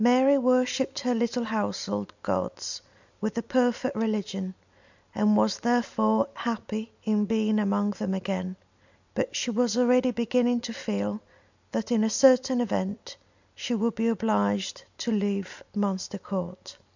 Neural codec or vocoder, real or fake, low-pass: none; real; 7.2 kHz